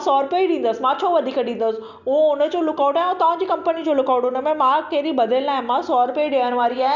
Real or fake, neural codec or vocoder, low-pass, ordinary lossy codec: real; none; 7.2 kHz; none